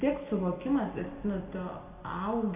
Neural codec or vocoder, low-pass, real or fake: none; 3.6 kHz; real